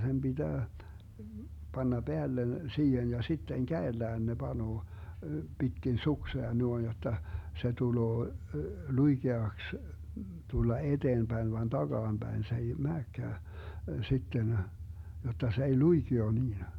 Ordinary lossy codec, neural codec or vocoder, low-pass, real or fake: none; none; 19.8 kHz; real